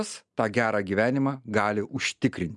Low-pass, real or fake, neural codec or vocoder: 10.8 kHz; real; none